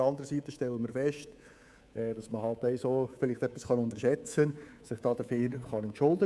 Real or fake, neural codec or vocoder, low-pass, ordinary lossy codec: fake; codec, 24 kHz, 3.1 kbps, DualCodec; none; none